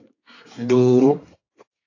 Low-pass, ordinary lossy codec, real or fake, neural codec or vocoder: 7.2 kHz; AAC, 48 kbps; fake; codec, 24 kHz, 1 kbps, SNAC